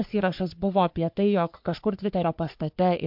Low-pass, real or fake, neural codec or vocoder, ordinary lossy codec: 5.4 kHz; fake; codec, 44.1 kHz, 3.4 kbps, Pupu-Codec; MP3, 48 kbps